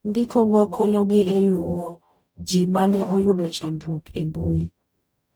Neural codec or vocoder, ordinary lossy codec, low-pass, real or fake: codec, 44.1 kHz, 0.9 kbps, DAC; none; none; fake